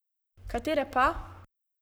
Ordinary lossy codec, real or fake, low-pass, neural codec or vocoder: none; fake; none; codec, 44.1 kHz, 7.8 kbps, Pupu-Codec